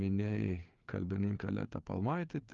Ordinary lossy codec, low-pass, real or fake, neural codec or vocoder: Opus, 32 kbps; 7.2 kHz; fake; codec, 16 kHz, 4 kbps, FreqCodec, larger model